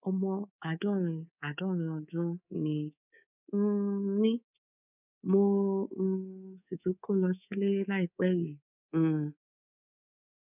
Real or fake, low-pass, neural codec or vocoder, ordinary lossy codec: fake; 3.6 kHz; codec, 24 kHz, 3.1 kbps, DualCodec; none